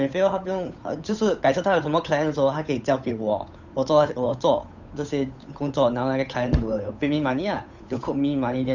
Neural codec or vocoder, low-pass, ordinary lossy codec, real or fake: codec, 16 kHz, 8 kbps, FunCodec, trained on Chinese and English, 25 frames a second; 7.2 kHz; none; fake